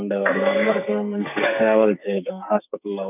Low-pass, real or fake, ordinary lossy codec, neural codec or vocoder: 3.6 kHz; fake; none; codec, 44.1 kHz, 2.6 kbps, SNAC